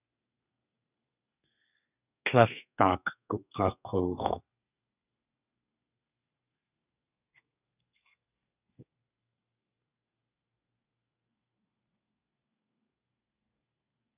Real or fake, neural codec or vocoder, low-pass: fake; codec, 32 kHz, 1.9 kbps, SNAC; 3.6 kHz